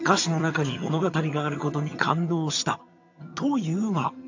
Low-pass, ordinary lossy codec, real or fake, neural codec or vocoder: 7.2 kHz; AAC, 48 kbps; fake; vocoder, 22.05 kHz, 80 mel bands, HiFi-GAN